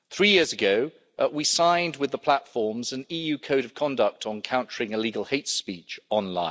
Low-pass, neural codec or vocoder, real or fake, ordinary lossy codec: none; none; real; none